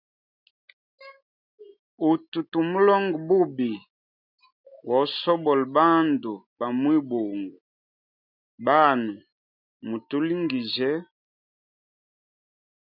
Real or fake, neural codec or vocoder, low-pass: real; none; 5.4 kHz